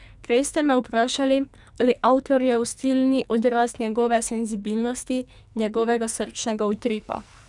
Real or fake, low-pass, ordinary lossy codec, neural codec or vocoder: fake; 10.8 kHz; none; codec, 44.1 kHz, 2.6 kbps, SNAC